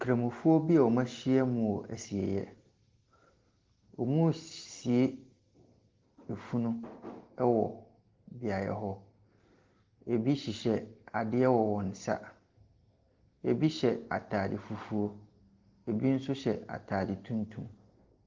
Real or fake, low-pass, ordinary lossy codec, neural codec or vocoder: real; 7.2 kHz; Opus, 16 kbps; none